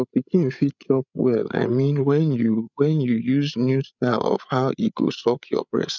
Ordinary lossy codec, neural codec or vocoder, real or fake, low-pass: none; codec, 16 kHz, 8 kbps, FreqCodec, larger model; fake; 7.2 kHz